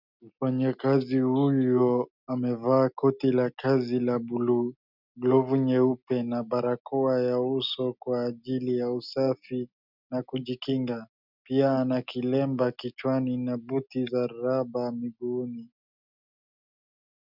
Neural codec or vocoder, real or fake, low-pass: none; real; 5.4 kHz